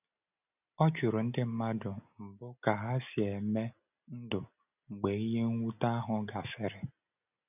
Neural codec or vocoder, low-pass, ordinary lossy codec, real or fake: none; 3.6 kHz; none; real